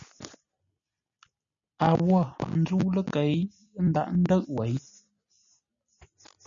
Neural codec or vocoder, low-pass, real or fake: none; 7.2 kHz; real